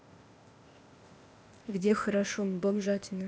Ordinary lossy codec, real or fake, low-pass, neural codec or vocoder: none; fake; none; codec, 16 kHz, 0.8 kbps, ZipCodec